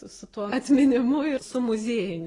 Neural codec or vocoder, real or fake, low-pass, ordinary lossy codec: none; real; 10.8 kHz; AAC, 32 kbps